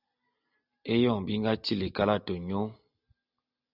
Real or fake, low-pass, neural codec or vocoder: real; 5.4 kHz; none